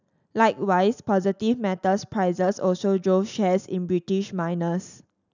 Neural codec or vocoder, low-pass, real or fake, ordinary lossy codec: none; 7.2 kHz; real; none